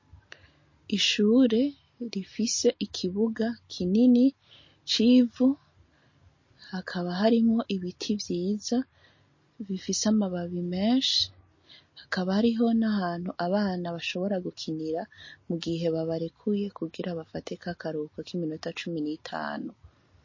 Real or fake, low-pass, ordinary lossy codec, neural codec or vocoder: real; 7.2 kHz; MP3, 32 kbps; none